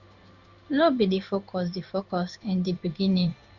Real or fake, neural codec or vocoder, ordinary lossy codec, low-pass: fake; codec, 16 kHz in and 24 kHz out, 1 kbps, XY-Tokenizer; none; 7.2 kHz